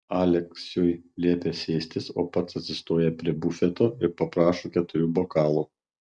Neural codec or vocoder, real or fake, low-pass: none; real; 9.9 kHz